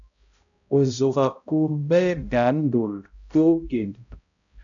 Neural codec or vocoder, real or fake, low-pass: codec, 16 kHz, 0.5 kbps, X-Codec, HuBERT features, trained on balanced general audio; fake; 7.2 kHz